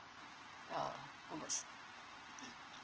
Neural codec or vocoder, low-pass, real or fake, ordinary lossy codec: none; 7.2 kHz; real; Opus, 16 kbps